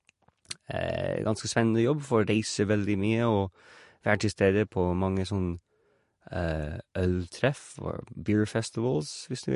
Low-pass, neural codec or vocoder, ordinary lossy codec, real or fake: 10.8 kHz; none; MP3, 48 kbps; real